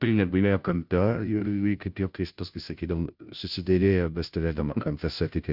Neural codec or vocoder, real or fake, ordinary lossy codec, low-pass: codec, 16 kHz, 0.5 kbps, FunCodec, trained on Chinese and English, 25 frames a second; fake; Opus, 64 kbps; 5.4 kHz